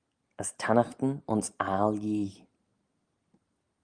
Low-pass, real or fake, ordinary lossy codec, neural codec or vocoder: 9.9 kHz; real; Opus, 32 kbps; none